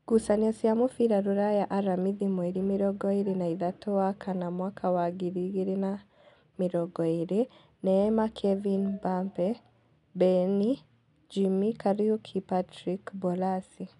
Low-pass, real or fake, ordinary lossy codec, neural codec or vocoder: 10.8 kHz; real; none; none